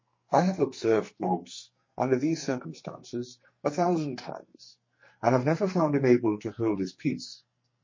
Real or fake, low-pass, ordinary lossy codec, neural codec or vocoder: fake; 7.2 kHz; MP3, 32 kbps; codec, 44.1 kHz, 2.6 kbps, SNAC